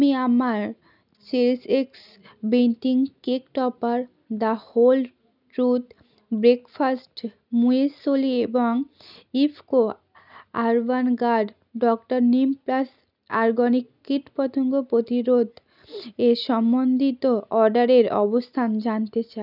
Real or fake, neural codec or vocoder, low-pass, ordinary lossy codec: real; none; 5.4 kHz; none